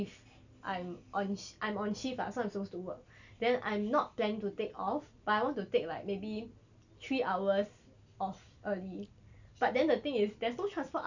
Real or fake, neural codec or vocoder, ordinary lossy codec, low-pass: real; none; none; 7.2 kHz